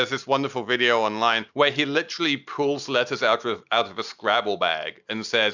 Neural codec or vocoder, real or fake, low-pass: none; real; 7.2 kHz